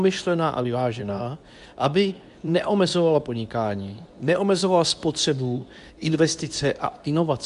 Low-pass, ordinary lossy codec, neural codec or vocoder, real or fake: 10.8 kHz; AAC, 96 kbps; codec, 24 kHz, 0.9 kbps, WavTokenizer, medium speech release version 2; fake